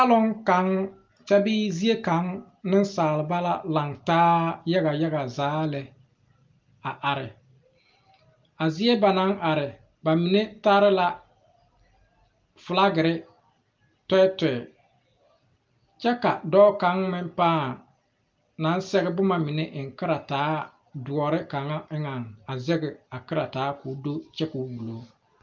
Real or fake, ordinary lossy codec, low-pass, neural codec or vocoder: real; Opus, 24 kbps; 7.2 kHz; none